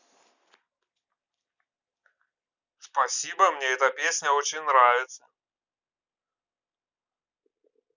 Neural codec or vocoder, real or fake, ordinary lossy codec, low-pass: none; real; none; 7.2 kHz